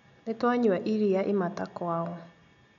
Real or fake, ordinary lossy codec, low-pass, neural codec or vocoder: real; none; 7.2 kHz; none